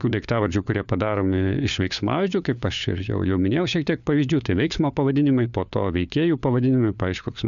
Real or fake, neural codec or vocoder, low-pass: fake; codec, 16 kHz, 4 kbps, FunCodec, trained on LibriTTS, 50 frames a second; 7.2 kHz